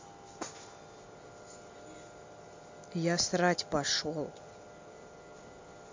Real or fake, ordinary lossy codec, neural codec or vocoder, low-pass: real; MP3, 48 kbps; none; 7.2 kHz